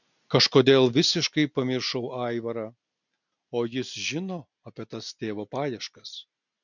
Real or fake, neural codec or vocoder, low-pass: real; none; 7.2 kHz